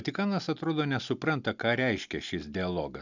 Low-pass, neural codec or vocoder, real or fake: 7.2 kHz; none; real